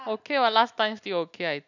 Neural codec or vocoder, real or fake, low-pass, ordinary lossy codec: none; real; 7.2 kHz; none